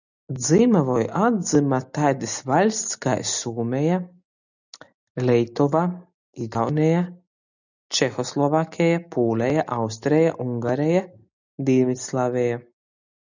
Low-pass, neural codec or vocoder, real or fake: 7.2 kHz; none; real